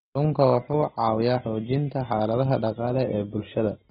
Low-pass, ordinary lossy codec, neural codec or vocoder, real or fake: 19.8 kHz; AAC, 16 kbps; none; real